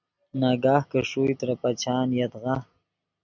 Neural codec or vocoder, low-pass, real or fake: none; 7.2 kHz; real